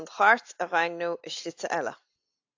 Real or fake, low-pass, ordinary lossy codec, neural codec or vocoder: real; 7.2 kHz; AAC, 48 kbps; none